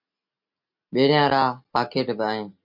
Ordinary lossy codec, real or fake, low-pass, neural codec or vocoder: MP3, 48 kbps; real; 5.4 kHz; none